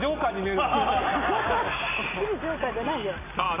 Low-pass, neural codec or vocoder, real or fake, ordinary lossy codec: 3.6 kHz; none; real; none